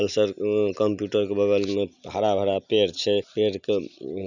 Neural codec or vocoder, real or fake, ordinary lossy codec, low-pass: none; real; none; 7.2 kHz